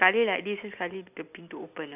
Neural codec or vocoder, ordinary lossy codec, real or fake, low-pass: none; none; real; 3.6 kHz